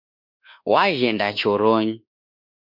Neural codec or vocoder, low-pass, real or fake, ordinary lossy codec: codec, 24 kHz, 1.2 kbps, DualCodec; 5.4 kHz; fake; MP3, 48 kbps